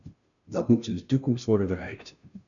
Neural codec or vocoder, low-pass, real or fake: codec, 16 kHz, 0.5 kbps, FunCodec, trained on Chinese and English, 25 frames a second; 7.2 kHz; fake